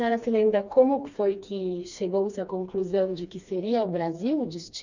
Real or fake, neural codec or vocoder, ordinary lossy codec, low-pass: fake; codec, 16 kHz, 2 kbps, FreqCodec, smaller model; Opus, 64 kbps; 7.2 kHz